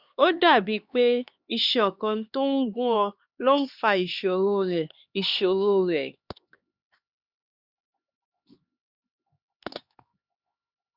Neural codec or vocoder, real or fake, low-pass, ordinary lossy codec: codec, 16 kHz, 2 kbps, X-Codec, HuBERT features, trained on LibriSpeech; fake; 5.4 kHz; Opus, 64 kbps